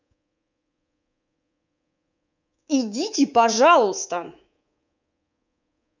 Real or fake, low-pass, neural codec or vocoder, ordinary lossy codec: fake; 7.2 kHz; codec, 24 kHz, 3.1 kbps, DualCodec; none